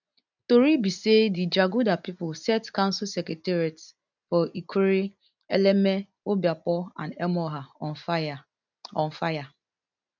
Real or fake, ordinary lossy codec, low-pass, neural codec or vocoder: real; none; 7.2 kHz; none